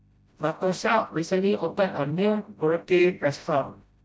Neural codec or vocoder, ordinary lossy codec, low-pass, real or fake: codec, 16 kHz, 0.5 kbps, FreqCodec, smaller model; none; none; fake